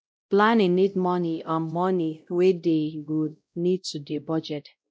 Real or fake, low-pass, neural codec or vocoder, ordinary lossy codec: fake; none; codec, 16 kHz, 0.5 kbps, X-Codec, WavLM features, trained on Multilingual LibriSpeech; none